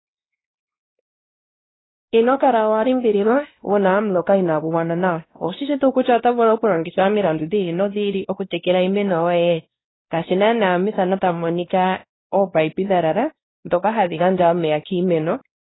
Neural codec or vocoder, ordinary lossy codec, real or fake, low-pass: codec, 16 kHz, 1 kbps, X-Codec, WavLM features, trained on Multilingual LibriSpeech; AAC, 16 kbps; fake; 7.2 kHz